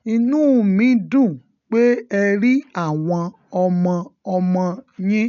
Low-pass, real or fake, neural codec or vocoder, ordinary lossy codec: 7.2 kHz; real; none; none